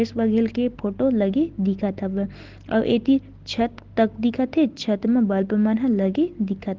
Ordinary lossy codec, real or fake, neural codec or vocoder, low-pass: Opus, 16 kbps; real; none; 7.2 kHz